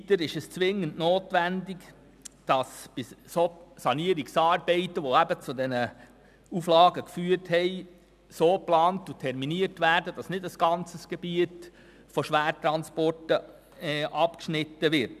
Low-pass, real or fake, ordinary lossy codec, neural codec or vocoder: 14.4 kHz; real; none; none